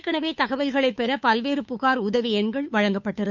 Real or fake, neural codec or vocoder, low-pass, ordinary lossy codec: fake; codec, 16 kHz, 2 kbps, FunCodec, trained on Chinese and English, 25 frames a second; 7.2 kHz; none